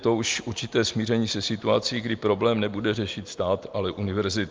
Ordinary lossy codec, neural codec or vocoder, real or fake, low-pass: Opus, 32 kbps; none; real; 7.2 kHz